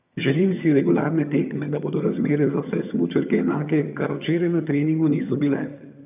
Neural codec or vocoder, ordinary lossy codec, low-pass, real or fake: vocoder, 22.05 kHz, 80 mel bands, HiFi-GAN; none; 3.6 kHz; fake